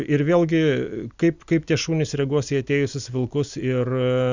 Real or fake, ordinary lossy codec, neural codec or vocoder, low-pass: real; Opus, 64 kbps; none; 7.2 kHz